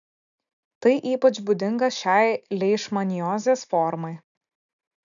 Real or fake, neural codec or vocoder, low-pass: real; none; 7.2 kHz